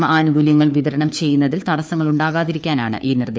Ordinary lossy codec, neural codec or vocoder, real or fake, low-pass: none; codec, 16 kHz, 4 kbps, FunCodec, trained on LibriTTS, 50 frames a second; fake; none